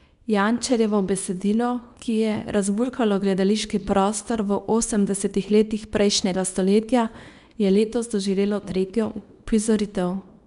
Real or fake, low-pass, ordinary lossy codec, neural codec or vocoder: fake; 10.8 kHz; none; codec, 24 kHz, 0.9 kbps, WavTokenizer, small release